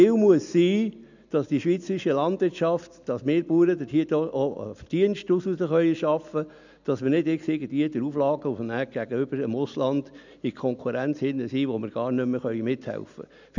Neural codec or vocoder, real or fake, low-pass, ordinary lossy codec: none; real; 7.2 kHz; none